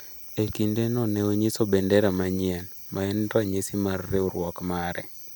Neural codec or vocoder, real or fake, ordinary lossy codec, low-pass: none; real; none; none